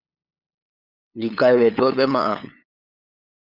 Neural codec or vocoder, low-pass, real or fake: codec, 16 kHz, 8 kbps, FunCodec, trained on LibriTTS, 25 frames a second; 5.4 kHz; fake